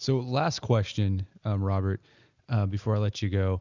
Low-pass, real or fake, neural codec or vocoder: 7.2 kHz; real; none